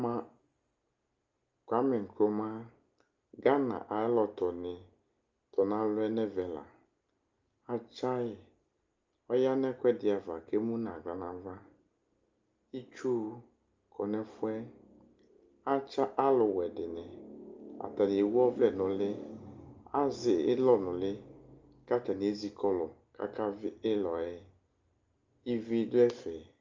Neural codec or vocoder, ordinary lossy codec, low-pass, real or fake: none; Opus, 32 kbps; 7.2 kHz; real